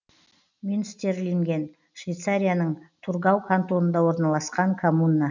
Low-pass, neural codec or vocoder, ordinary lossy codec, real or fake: 7.2 kHz; none; none; real